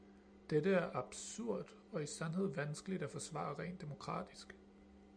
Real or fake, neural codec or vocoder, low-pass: real; none; 9.9 kHz